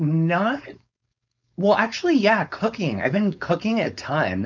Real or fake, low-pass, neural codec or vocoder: fake; 7.2 kHz; codec, 16 kHz, 4.8 kbps, FACodec